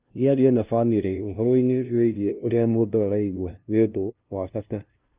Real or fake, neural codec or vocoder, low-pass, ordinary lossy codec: fake; codec, 16 kHz, 0.5 kbps, FunCodec, trained on LibriTTS, 25 frames a second; 3.6 kHz; Opus, 32 kbps